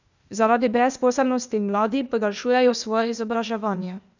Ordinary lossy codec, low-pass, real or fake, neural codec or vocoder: none; 7.2 kHz; fake; codec, 16 kHz, 0.8 kbps, ZipCodec